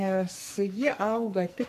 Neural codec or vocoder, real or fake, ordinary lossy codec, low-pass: codec, 44.1 kHz, 2.6 kbps, SNAC; fake; MP3, 64 kbps; 14.4 kHz